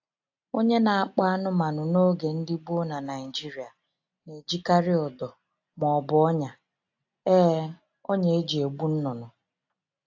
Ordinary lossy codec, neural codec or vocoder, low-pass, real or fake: AAC, 48 kbps; none; 7.2 kHz; real